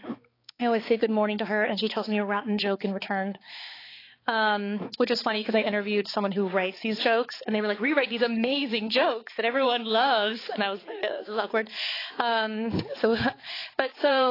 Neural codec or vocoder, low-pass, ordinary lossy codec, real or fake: codec, 16 kHz, 4 kbps, X-Codec, HuBERT features, trained on balanced general audio; 5.4 kHz; AAC, 24 kbps; fake